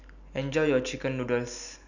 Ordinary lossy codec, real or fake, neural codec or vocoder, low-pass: none; real; none; 7.2 kHz